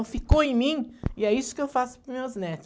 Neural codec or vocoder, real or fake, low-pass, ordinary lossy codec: none; real; none; none